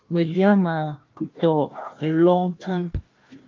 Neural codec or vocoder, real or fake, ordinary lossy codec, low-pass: codec, 16 kHz, 1 kbps, FunCodec, trained on Chinese and English, 50 frames a second; fake; Opus, 24 kbps; 7.2 kHz